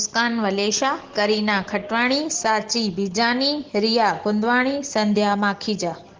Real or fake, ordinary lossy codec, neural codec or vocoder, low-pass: real; Opus, 16 kbps; none; 7.2 kHz